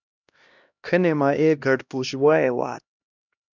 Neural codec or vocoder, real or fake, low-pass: codec, 16 kHz, 1 kbps, X-Codec, HuBERT features, trained on LibriSpeech; fake; 7.2 kHz